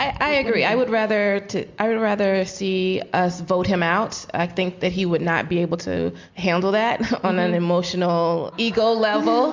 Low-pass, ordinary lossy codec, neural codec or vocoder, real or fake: 7.2 kHz; MP3, 64 kbps; none; real